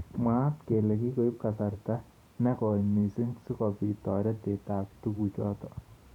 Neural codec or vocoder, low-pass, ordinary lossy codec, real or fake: vocoder, 44.1 kHz, 128 mel bands every 512 samples, BigVGAN v2; 19.8 kHz; none; fake